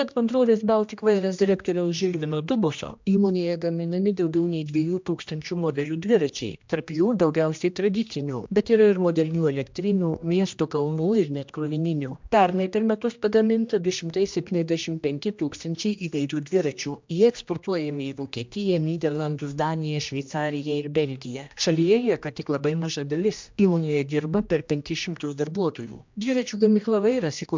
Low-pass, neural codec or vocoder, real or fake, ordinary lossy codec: 7.2 kHz; codec, 16 kHz, 1 kbps, X-Codec, HuBERT features, trained on general audio; fake; MP3, 64 kbps